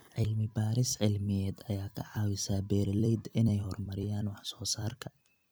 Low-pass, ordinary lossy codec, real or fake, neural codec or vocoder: none; none; fake; vocoder, 44.1 kHz, 128 mel bands every 256 samples, BigVGAN v2